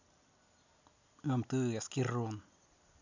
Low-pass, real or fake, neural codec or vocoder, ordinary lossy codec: 7.2 kHz; real; none; none